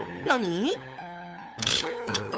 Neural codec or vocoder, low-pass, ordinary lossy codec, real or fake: codec, 16 kHz, 8 kbps, FunCodec, trained on LibriTTS, 25 frames a second; none; none; fake